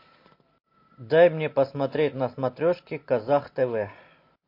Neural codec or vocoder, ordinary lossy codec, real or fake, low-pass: none; MP3, 32 kbps; real; 5.4 kHz